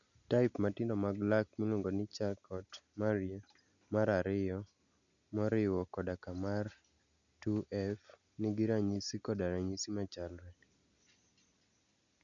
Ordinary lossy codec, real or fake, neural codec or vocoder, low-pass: none; real; none; 7.2 kHz